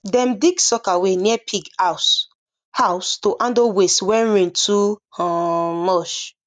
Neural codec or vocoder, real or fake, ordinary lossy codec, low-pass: none; real; none; 9.9 kHz